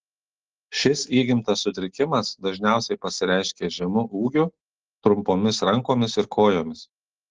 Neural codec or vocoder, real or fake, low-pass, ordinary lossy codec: none; real; 7.2 kHz; Opus, 16 kbps